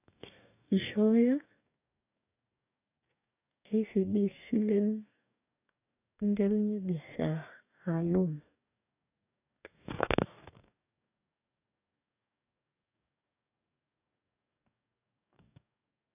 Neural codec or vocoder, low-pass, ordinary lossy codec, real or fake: codec, 44.1 kHz, 2.6 kbps, DAC; 3.6 kHz; none; fake